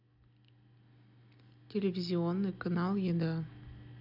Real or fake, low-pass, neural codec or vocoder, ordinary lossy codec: real; 5.4 kHz; none; none